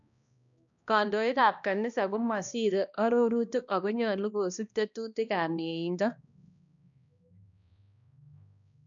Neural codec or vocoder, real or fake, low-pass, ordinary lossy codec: codec, 16 kHz, 1 kbps, X-Codec, HuBERT features, trained on balanced general audio; fake; 7.2 kHz; none